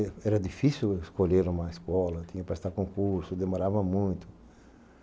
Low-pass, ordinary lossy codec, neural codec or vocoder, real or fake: none; none; none; real